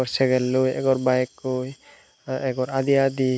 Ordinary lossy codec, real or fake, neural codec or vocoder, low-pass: none; real; none; none